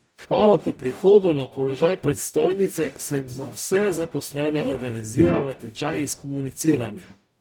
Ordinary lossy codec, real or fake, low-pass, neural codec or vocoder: none; fake; none; codec, 44.1 kHz, 0.9 kbps, DAC